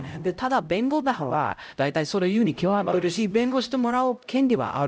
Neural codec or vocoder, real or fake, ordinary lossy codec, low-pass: codec, 16 kHz, 0.5 kbps, X-Codec, HuBERT features, trained on LibriSpeech; fake; none; none